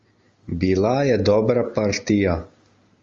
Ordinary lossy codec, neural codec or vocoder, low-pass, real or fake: Opus, 32 kbps; none; 7.2 kHz; real